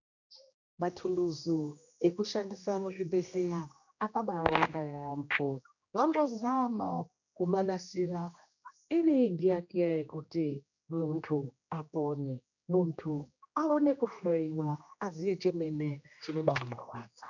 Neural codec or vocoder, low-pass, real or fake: codec, 16 kHz, 1 kbps, X-Codec, HuBERT features, trained on general audio; 7.2 kHz; fake